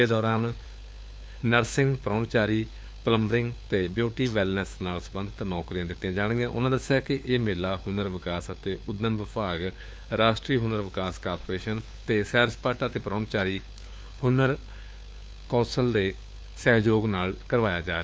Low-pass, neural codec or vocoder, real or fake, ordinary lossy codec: none; codec, 16 kHz, 4 kbps, FunCodec, trained on LibriTTS, 50 frames a second; fake; none